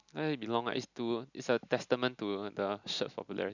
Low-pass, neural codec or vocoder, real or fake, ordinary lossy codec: 7.2 kHz; none; real; none